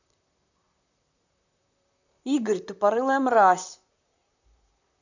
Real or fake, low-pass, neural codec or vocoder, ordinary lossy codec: fake; 7.2 kHz; vocoder, 44.1 kHz, 128 mel bands, Pupu-Vocoder; none